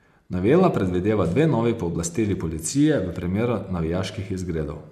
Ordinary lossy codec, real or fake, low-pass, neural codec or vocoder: none; real; 14.4 kHz; none